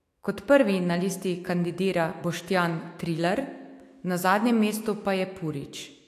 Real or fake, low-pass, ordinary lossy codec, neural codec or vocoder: fake; 14.4 kHz; AAC, 64 kbps; autoencoder, 48 kHz, 128 numbers a frame, DAC-VAE, trained on Japanese speech